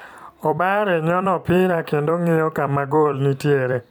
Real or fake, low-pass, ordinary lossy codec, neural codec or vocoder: fake; 19.8 kHz; none; vocoder, 44.1 kHz, 128 mel bands every 256 samples, BigVGAN v2